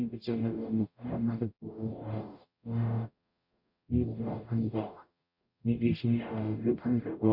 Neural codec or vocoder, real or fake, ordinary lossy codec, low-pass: codec, 44.1 kHz, 0.9 kbps, DAC; fake; none; 5.4 kHz